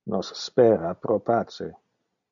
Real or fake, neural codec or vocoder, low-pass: real; none; 7.2 kHz